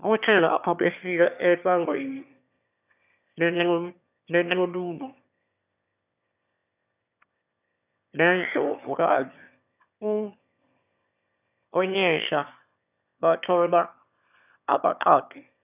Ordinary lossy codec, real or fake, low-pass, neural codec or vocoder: none; fake; 3.6 kHz; autoencoder, 22.05 kHz, a latent of 192 numbers a frame, VITS, trained on one speaker